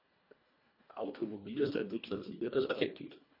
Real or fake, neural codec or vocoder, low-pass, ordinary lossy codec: fake; codec, 24 kHz, 1.5 kbps, HILCodec; 5.4 kHz; MP3, 32 kbps